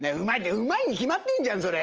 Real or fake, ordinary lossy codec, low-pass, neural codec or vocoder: real; Opus, 24 kbps; 7.2 kHz; none